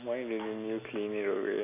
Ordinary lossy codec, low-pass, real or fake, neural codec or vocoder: none; 3.6 kHz; real; none